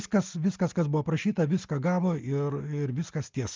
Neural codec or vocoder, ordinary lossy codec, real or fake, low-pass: none; Opus, 32 kbps; real; 7.2 kHz